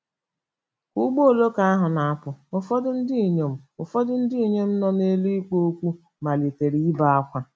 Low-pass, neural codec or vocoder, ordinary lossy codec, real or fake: none; none; none; real